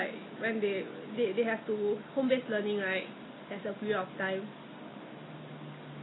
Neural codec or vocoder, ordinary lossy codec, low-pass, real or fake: none; AAC, 16 kbps; 7.2 kHz; real